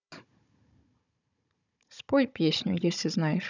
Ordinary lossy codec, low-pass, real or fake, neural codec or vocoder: none; 7.2 kHz; fake; codec, 16 kHz, 16 kbps, FunCodec, trained on Chinese and English, 50 frames a second